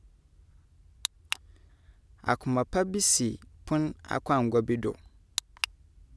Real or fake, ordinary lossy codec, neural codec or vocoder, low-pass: real; none; none; none